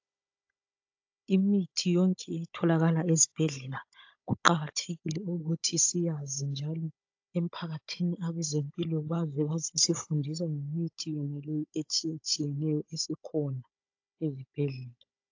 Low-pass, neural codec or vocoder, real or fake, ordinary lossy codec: 7.2 kHz; codec, 16 kHz, 16 kbps, FunCodec, trained on Chinese and English, 50 frames a second; fake; AAC, 48 kbps